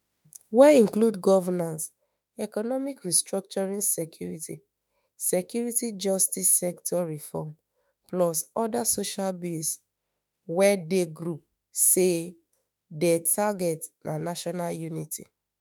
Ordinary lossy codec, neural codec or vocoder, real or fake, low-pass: none; autoencoder, 48 kHz, 32 numbers a frame, DAC-VAE, trained on Japanese speech; fake; none